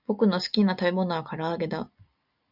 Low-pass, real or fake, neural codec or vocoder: 5.4 kHz; real; none